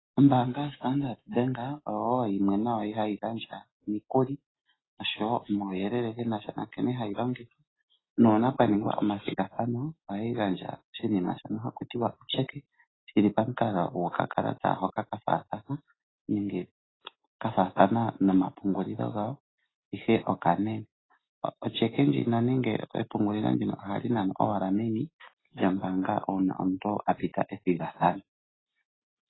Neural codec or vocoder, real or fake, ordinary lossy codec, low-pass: none; real; AAC, 16 kbps; 7.2 kHz